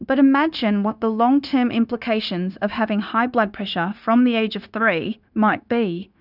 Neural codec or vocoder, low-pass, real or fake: codec, 16 kHz, 0.9 kbps, LongCat-Audio-Codec; 5.4 kHz; fake